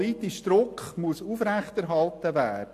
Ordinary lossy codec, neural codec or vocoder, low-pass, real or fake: AAC, 64 kbps; none; 14.4 kHz; real